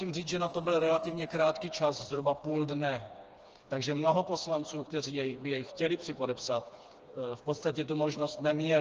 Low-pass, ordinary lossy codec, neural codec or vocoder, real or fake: 7.2 kHz; Opus, 24 kbps; codec, 16 kHz, 2 kbps, FreqCodec, smaller model; fake